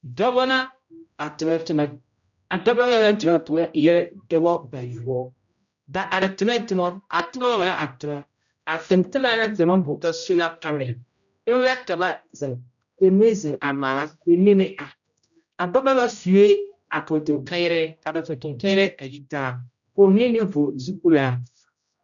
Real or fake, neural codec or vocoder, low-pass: fake; codec, 16 kHz, 0.5 kbps, X-Codec, HuBERT features, trained on general audio; 7.2 kHz